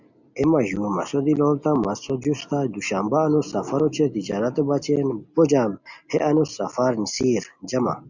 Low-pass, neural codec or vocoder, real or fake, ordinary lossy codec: 7.2 kHz; none; real; Opus, 64 kbps